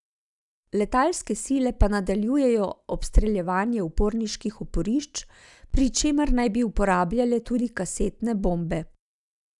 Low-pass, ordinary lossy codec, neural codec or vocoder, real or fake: 10.8 kHz; none; none; real